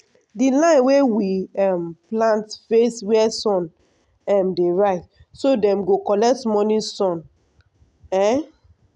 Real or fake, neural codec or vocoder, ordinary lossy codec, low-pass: real; none; none; 10.8 kHz